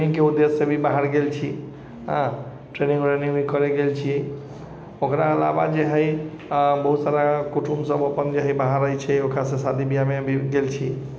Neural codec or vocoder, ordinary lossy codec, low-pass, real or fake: none; none; none; real